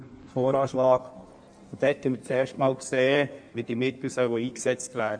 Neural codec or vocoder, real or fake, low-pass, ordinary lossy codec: codec, 16 kHz in and 24 kHz out, 1.1 kbps, FireRedTTS-2 codec; fake; 9.9 kHz; none